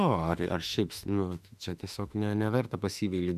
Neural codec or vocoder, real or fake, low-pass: autoencoder, 48 kHz, 32 numbers a frame, DAC-VAE, trained on Japanese speech; fake; 14.4 kHz